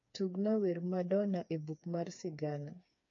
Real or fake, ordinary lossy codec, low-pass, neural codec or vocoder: fake; AAC, 48 kbps; 7.2 kHz; codec, 16 kHz, 4 kbps, FreqCodec, smaller model